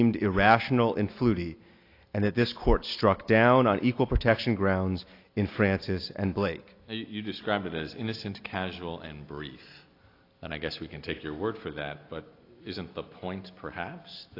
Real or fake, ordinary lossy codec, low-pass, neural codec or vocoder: real; AAC, 32 kbps; 5.4 kHz; none